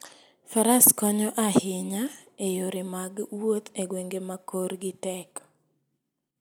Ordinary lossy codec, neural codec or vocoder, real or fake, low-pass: none; none; real; none